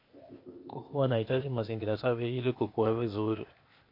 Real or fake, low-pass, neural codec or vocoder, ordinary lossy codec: fake; 5.4 kHz; codec, 16 kHz, 0.8 kbps, ZipCodec; MP3, 32 kbps